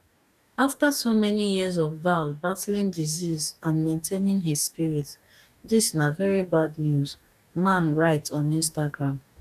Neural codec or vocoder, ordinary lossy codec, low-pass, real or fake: codec, 44.1 kHz, 2.6 kbps, DAC; none; 14.4 kHz; fake